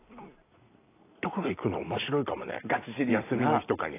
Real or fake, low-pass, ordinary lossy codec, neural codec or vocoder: fake; 3.6 kHz; AAC, 24 kbps; vocoder, 44.1 kHz, 128 mel bands every 256 samples, BigVGAN v2